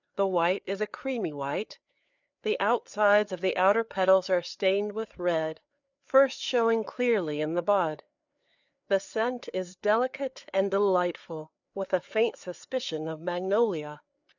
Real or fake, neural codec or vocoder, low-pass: fake; codec, 16 kHz, 4 kbps, FreqCodec, larger model; 7.2 kHz